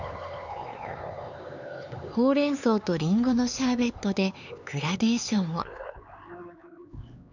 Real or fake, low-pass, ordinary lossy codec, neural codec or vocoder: fake; 7.2 kHz; none; codec, 16 kHz, 4 kbps, X-Codec, HuBERT features, trained on LibriSpeech